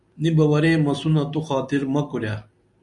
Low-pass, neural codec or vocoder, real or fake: 10.8 kHz; none; real